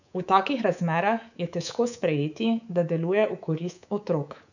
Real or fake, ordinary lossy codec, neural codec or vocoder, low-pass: fake; none; codec, 24 kHz, 3.1 kbps, DualCodec; 7.2 kHz